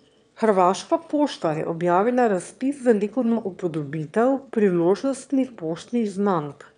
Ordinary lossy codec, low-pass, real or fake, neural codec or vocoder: none; 9.9 kHz; fake; autoencoder, 22.05 kHz, a latent of 192 numbers a frame, VITS, trained on one speaker